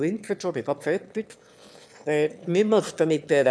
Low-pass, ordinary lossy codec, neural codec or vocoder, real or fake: none; none; autoencoder, 22.05 kHz, a latent of 192 numbers a frame, VITS, trained on one speaker; fake